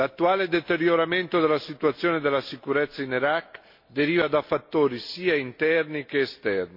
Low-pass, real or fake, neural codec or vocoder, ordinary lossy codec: 5.4 kHz; real; none; MP3, 32 kbps